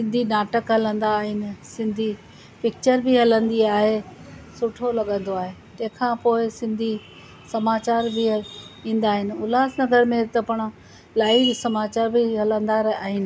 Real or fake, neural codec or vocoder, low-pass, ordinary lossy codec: real; none; none; none